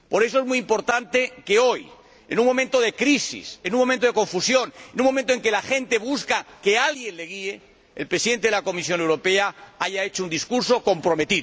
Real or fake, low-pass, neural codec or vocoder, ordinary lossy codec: real; none; none; none